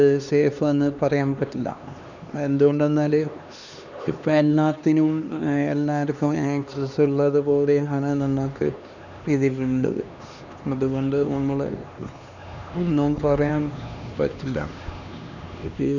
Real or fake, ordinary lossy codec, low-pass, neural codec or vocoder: fake; none; 7.2 kHz; codec, 16 kHz, 2 kbps, X-Codec, HuBERT features, trained on LibriSpeech